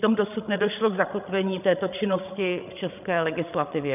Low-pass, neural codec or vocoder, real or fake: 3.6 kHz; codec, 16 kHz, 16 kbps, FreqCodec, larger model; fake